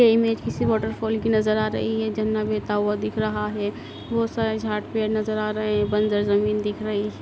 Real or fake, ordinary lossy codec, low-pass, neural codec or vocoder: real; none; none; none